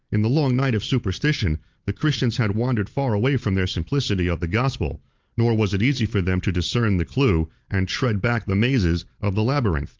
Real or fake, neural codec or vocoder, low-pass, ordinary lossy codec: real; none; 7.2 kHz; Opus, 24 kbps